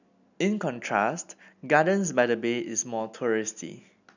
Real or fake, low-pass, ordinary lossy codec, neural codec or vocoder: real; 7.2 kHz; none; none